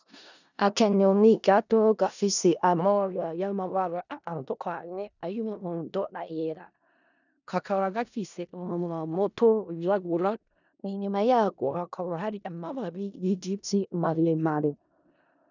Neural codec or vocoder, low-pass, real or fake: codec, 16 kHz in and 24 kHz out, 0.4 kbps, LongCat-Audio-Codec, four codebook decoder; 7.2 kHz; fake